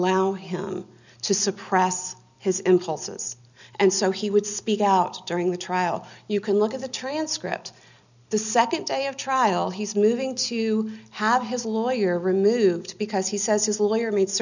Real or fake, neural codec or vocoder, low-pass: real; none; 7.2 kHz